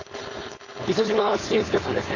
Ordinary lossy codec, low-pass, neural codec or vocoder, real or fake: none; 7.2 kHz; codec, 16 kHz, 4.8 kbps, FACodec; fake